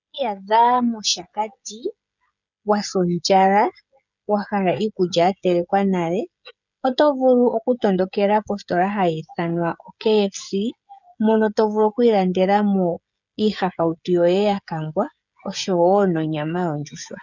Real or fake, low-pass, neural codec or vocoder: fake; 7.2 kHz; codec, 16 kHz, 16 kbps, FreqCodec, smaller model